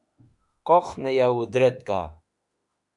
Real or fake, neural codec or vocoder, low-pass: fake; autoencoder, 48 kHz, 32 numbers a frame, DAC-VAE, trained on Japanese speech; 10.8 kHz